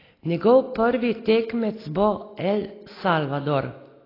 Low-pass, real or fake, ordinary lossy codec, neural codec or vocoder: 5.4 kHz; real; AAC, 24 kbps; none